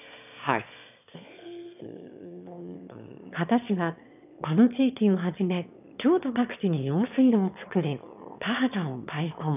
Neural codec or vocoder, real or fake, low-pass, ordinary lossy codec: autoencoder, 22.05 kHz, a latent of 192 numbers a frame, VITS, trained on one speaker; fake; 3.6 kHz; none